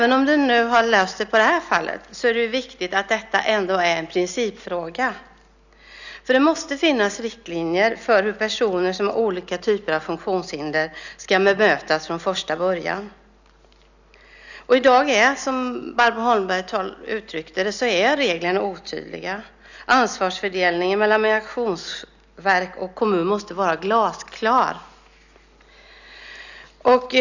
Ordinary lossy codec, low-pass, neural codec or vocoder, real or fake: none; 7.2 kHz; none; real